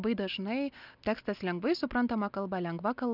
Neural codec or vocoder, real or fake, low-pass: none; real; 5.4 kHz